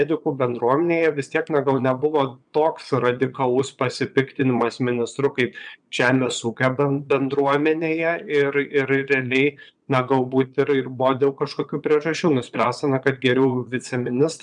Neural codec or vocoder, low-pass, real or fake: vocoder, 22.05 kHz, 80 mel bands, Vocos; 9.9 kHz; fake